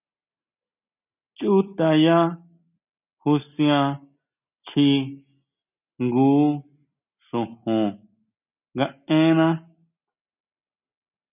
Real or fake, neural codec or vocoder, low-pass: real; none; 3.6 kHz